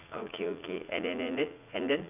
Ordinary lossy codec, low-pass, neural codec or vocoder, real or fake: none; 3.6 kHz; vocoder, 44.1 kHz, 80 mel bands, Vocos; fake